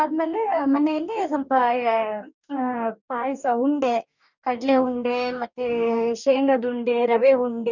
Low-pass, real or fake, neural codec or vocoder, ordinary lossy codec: 7.2 kHz; fake; codec, 44.1 kHz, 2.6 kbps, DAC; none